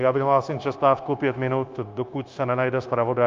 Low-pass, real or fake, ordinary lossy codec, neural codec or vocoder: 7.2 kHz; fake; Opus, 24 kbps; codec, 16 kHz, 0.9 kbps, LongCat-Audio-Codec